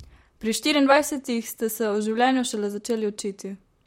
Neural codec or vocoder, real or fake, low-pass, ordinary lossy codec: vocoder, 44.1 kHz, 128 mel bands, Pupu-Vocoder; fake; 19.8 kHz; MP3, 64 kbps